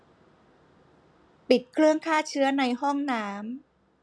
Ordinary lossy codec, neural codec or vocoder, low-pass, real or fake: none; none; none; real